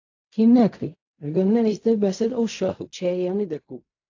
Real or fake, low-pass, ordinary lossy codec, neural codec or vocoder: fake; 7.2 kHz; AAC, 48 kbps; codec, 16 kHz in and 24 kHz out, 0.4 kbps, LongCat-Audio-Codec, fine tuned four codebook decoder